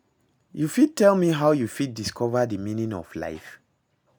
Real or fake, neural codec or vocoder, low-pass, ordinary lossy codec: real; none; none; none